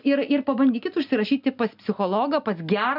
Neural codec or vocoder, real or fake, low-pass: none; real; 5.4 kHz